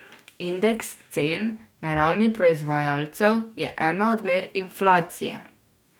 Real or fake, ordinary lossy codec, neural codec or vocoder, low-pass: fake; none; codec, 44.1 kHz, 2.6 kbps, DAC; none